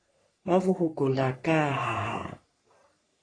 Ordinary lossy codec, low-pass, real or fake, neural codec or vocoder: AAC, 32 kbps; 9.9 kHz; fake; codec, 44.1 kHz, 3.4 kbps, Pupu-Codec